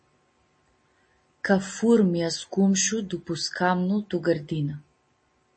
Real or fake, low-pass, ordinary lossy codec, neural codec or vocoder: real; 9.9 kHz; MP3, 32 kbps; none